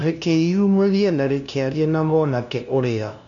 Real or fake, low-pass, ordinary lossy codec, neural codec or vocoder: fake; 7.2 kHz; none; codec, 16 kHz, 0.5 kbps, FunCodec, trained on LibriTTS, 25 frames a second